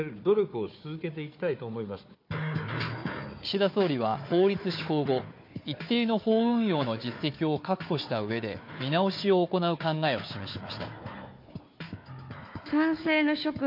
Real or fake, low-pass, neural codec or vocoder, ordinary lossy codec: fake; 5.4 kHz; codec, 16 kHz, 4 kbps, FunCodec, trained on Chinese and English, 50 frames a second; MP3, 32 kbps